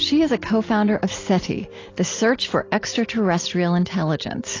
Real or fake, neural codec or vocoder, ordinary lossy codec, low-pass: real; none; AAC, 32 kbps; 7.2 kHz